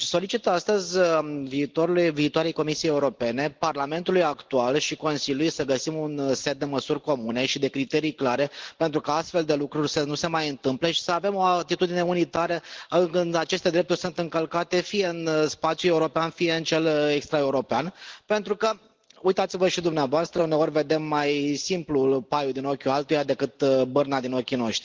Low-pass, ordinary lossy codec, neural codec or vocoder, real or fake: 7.2 kHz; Opus, 16 kbps; none; real